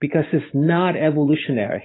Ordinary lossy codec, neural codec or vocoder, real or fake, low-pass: AAC, 16 kbps; none; real; 7.2 kHz